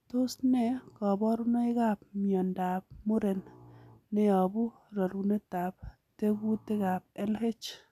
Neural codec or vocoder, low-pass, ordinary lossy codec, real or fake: none; 14.4 kHz; none; real